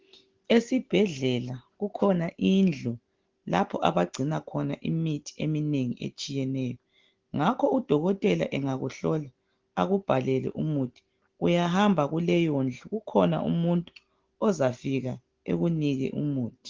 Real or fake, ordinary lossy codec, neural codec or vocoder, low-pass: real; Opus, 16 kbps; none; 7.2 kHz